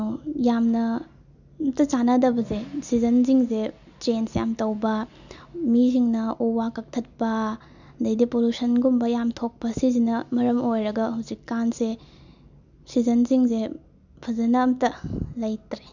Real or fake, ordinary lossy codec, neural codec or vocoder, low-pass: real; none; none; 7.2 kHz